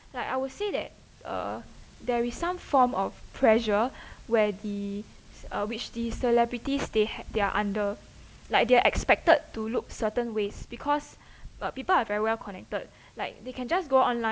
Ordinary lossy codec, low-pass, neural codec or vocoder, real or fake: none; none; none; real